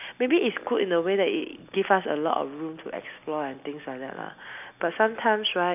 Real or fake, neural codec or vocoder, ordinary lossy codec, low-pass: real; none; none; 3.6 kHz